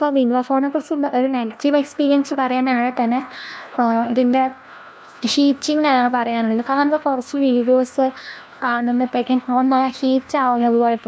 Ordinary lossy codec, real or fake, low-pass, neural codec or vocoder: none; fake; none; codec, 16 kHz, 1 kbps, FunCodec, trained on LibriTTS, 50 frames a second